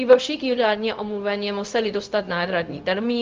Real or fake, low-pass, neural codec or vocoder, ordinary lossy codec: fake; 7.2 kHz; codec, 16 kHz, 0.4 kbps, LongCat-Audio-Codec; Opus, 24 kbps